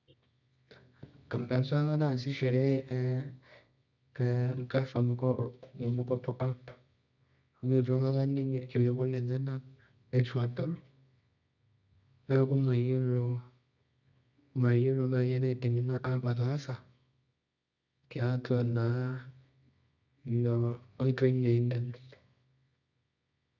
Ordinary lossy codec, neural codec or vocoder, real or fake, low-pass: none; codec, 24 kHz, 0.9 kbps, WavTokenizer, medium music audio release; fake; 7.2 kHz